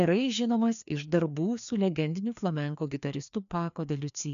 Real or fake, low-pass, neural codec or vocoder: fake; 7.2 kHz; codec, 16 kHz, 2 kbps, FreqCodec, larger model